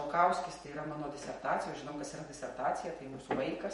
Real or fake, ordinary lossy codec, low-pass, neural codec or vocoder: fake; MP3, 48 kbps; 19.8 kHz; vocoder, 48 kHz, 128 mel bands, Vocos